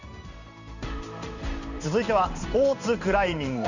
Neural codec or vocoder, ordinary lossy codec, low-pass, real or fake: codec, 16 kHz in and 24 kHz out, 1 kbps, XY-Tokenizer; none; 7.2 kHz; fake